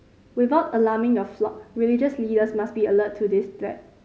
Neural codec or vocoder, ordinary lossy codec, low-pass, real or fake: none; none; none; real